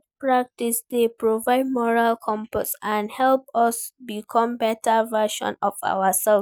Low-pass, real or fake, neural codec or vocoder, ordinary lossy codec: 19.8 kHz; real; none; none